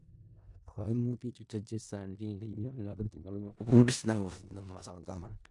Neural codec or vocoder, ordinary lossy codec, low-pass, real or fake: codec, 16 kHz in and 24 kHz out, 0.4 kbps, LongCat-Audio-Codec, four codebook decoder; MP3, 64 kbps; 10.8 kHz; fake